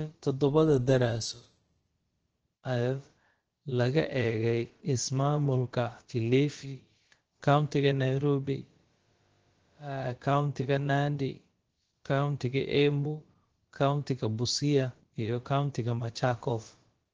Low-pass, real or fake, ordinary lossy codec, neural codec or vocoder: 7.2 kHz; fake; Opus, 16 kbps; codec, 16 kHz, about 1 kbps, DyCAST, with the encoder's durations